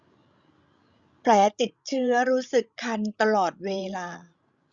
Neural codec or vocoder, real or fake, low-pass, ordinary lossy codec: codec, 16 kHz, 16 kbps, FreqCodec, larger model; fake; 7.2 kHz; Opus, 64 kbps